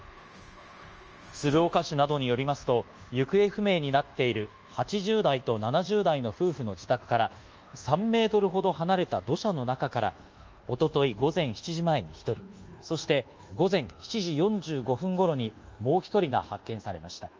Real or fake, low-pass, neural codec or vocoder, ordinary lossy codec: fake; 7.2 kHz; codec, 24 kHz, 1.2 kbps, DualCodec; Opus, 24 kbps